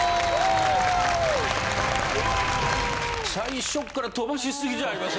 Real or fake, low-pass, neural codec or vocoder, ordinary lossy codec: real; none; none; none